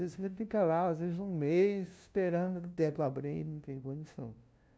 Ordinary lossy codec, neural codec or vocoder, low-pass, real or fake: none; codec, 16 kHz, 0.5 kbps, FunCodec, trained on LibriTTS, 25 frames a second; none; fake